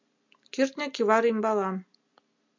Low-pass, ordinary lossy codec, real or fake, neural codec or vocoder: 7.2 kHz; MP3, 64 kbps; real; none